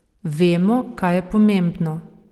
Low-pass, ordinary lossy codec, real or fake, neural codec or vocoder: 19.8 kHz; Opus, 16 kbps; real; none